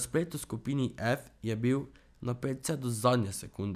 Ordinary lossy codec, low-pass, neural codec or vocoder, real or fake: none; 14.4 kHz; none; real